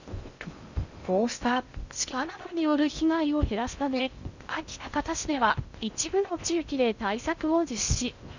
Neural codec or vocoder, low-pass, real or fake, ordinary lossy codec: codec, 16 kHz in and 24 kHz out, 0.6 kbps, FocalCodec, streaming, 2048 codes; 7.2 kHz; fake; Opus, 64 kbps